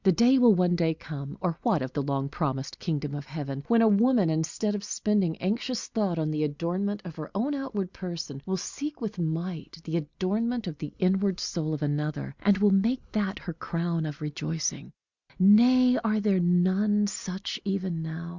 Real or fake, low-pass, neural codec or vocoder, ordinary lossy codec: real; 7.2 kHz; none; Opus, 64 kbps